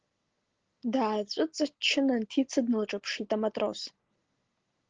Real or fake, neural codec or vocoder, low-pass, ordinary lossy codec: real; none; 7.2 kHz; Opus, 16 kbps